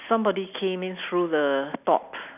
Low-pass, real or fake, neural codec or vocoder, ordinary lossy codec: 3.6 kHz; real; none; none